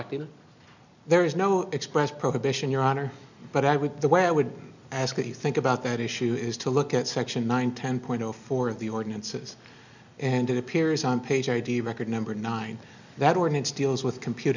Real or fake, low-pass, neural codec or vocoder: real; 7.2 kHz; none